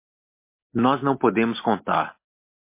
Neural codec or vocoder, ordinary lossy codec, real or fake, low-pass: none; MP3, 24 kbps; real; 3.6 kHz